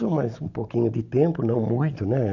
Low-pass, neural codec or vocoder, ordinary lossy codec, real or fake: 7.2 kHz; none; none; real